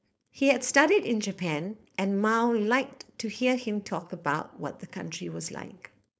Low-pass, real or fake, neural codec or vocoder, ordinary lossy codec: none; fake; codec, 16 kHz, 4.8 kbps, FACodec; none